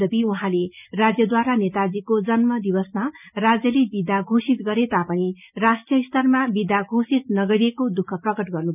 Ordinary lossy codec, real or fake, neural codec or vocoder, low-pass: none; real; none; 3.6 kHz